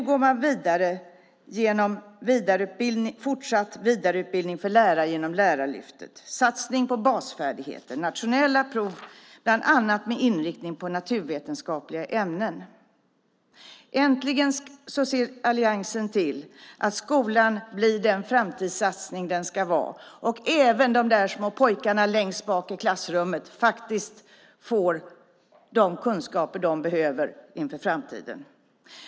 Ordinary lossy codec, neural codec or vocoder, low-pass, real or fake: none; none; none; real